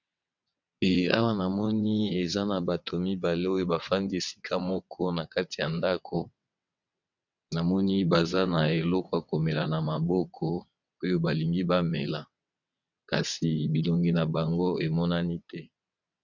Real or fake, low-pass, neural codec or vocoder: fake; 7.2 kHz; vocoder, 22.05 kHz, 80 mel bands, WaveNeXt